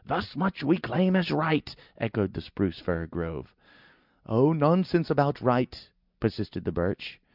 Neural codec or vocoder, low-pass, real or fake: none; 5.4 kHz; real